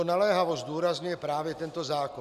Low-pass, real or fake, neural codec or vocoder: 14.4 kHz; real; none